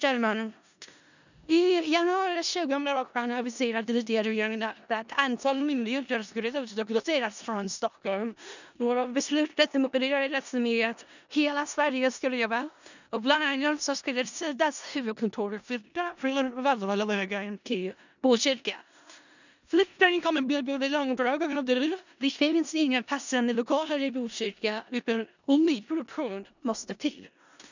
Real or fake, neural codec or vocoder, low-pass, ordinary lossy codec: fake; codec, 16 kHz in and 24 kHz out, 0.4 kbps, LongCat-Audio-Codec, four codebook decoder; 7.2 kHz; none